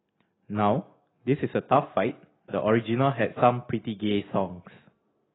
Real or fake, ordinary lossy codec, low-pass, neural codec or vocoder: real; AAC, 16 kbps; 7.2 kHz; none